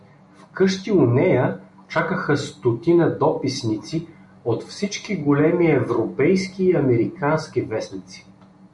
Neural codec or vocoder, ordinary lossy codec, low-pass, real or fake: none; MP3, 96 kbps; 10.8 kHz; real